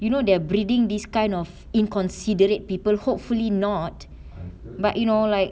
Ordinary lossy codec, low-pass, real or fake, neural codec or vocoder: none; none; real; none